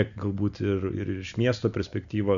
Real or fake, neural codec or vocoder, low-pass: real; none; 7.2 kHz